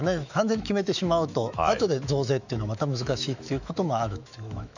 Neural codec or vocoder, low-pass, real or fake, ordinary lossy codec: autoencoder, 48 kHz, 128 numbers a frame, DAC-VAE, trained on Japanese speech; 7.2 kHz; fake; none